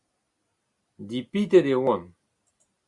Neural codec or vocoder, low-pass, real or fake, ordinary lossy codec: none; 10.8 kHz; real; Opus, 64 kbps